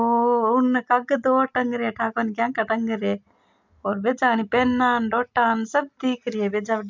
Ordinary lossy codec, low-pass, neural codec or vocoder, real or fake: MP3, 64 kbps; 7.2 kHz; none; real